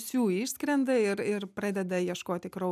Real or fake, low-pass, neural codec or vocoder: real; 14.4 kHz; none